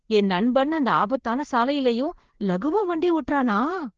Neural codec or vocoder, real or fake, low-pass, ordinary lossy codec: codec, 16 kHz, 2 kbps, FreqCodec, larger model; fake; 7.2 kHz; Opus, 16 kbps